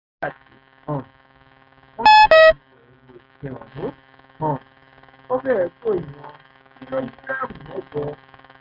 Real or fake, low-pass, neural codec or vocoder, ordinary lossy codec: fake; 5.4 kHz; codec, 16 kHz, 6 kbps, DAC; none